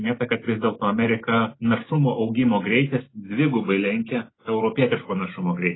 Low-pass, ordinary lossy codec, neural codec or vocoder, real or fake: 7.2 kHz; AAC, 16 kbps; none; real